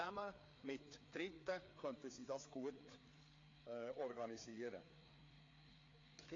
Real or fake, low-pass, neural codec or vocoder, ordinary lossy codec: fake; 7.2 kHz; codec, 16 kHz, 4 kbps, FreqCodec, larger model; AAC, 32 kbps